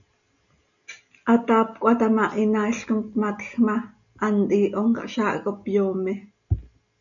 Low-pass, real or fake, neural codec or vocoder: 7.2 kHz; real; none